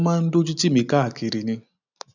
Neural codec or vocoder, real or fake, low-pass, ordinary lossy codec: none; real; 7.2 kHz; none